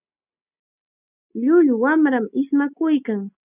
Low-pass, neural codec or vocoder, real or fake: 3.6 kHz; none; real